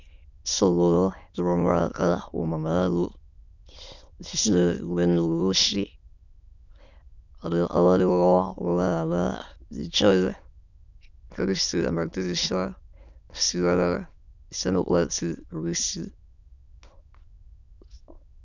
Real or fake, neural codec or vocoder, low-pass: fake; autoencoder, 22.05 kHz, a latent of 192 numbers a frame, VITS, trained on many speakers; 7.2 kHz